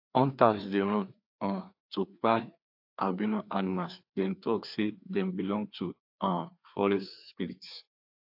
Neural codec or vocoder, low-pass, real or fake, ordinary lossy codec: codec, 16 kHz, 2 kbps, FreqCodec, larger model; 5.4 kHz; fake; AAC, 48 kbps